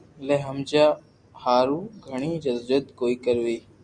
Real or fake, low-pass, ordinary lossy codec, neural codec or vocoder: real; 9.9 kHz; Opus, 64 kbps; none